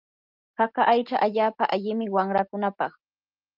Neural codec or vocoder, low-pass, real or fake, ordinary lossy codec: none; 5.4 kHz; real; Opus, 24 kbps